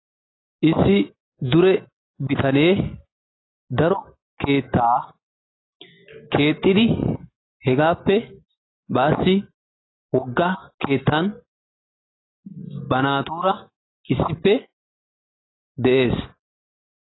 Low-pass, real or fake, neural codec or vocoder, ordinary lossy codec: 7.2 kHz; real; none; AAC, 16 kbps